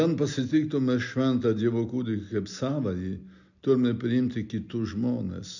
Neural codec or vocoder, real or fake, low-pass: none; real; 7.2 kHz